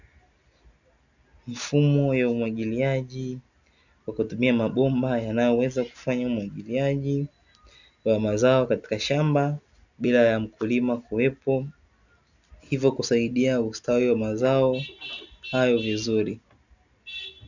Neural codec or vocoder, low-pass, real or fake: none; 7.2 kHz; real